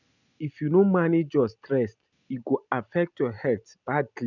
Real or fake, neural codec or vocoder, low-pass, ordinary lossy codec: real; none; 7.2 kHz; none